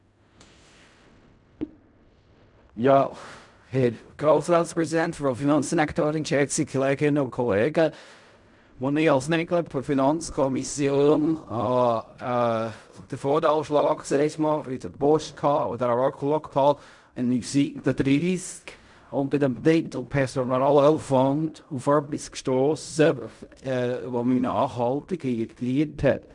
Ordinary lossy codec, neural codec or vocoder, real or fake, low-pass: none; codec, 16 kHz in and 24 kHz out, 0.4 kbps, LongCat-Audio-Codec, fine tuned four codebook decoder; fake; 10.8 kHz